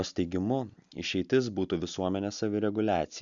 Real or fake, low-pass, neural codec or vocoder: real; 7.2 kHz; none